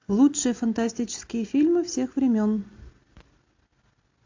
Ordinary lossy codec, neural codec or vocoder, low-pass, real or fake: AAC, 48 kbps; none; 7.2 kHz; real